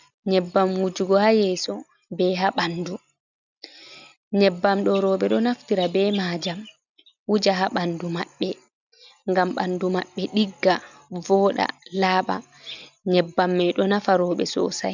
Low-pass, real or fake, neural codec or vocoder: 7.2 kHz; real; none